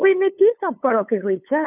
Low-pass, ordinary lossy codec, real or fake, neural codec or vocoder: 3.6 kHz; none; fake; codec, 16 kHz, 8 kbps, FunCodec, trained on LibriTTS, 25 frames a second